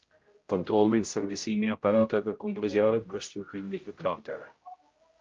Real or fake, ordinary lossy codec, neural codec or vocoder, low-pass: fake; Opus, 24 kbps; codec, 16 kHz, 0.5 kbps, X-Codec, HuBERT features, trained on general audio; 7.2 kHz